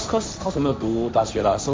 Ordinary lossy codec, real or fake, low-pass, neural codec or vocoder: none; fake; none; codec, 16 kHz, 1.1 kbps, Voila-Tokenizer